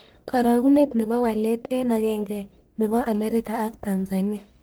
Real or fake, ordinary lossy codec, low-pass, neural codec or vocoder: fake; none; none; codec, 44.1 kHz, 1.7 kbps, Pupu-Codec